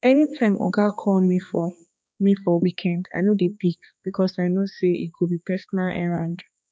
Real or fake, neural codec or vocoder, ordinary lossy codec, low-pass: fake; codec, 16 kHz, 2 kbps, X-Codec, HuBERT features, trained on balanced general audio; none; none